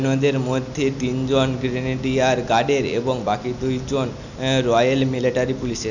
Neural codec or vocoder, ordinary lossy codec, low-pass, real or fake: none; none; 7.2 kHz; real